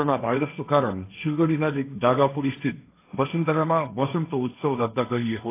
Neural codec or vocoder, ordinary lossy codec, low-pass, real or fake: codec, 16 kHz, 1.1 kbps, Voila-Tokenizer; AAC, 24 kbps; 3.6 kHz; fake